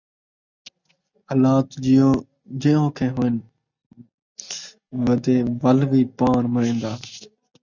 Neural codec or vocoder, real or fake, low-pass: none; real; 7.2 kHz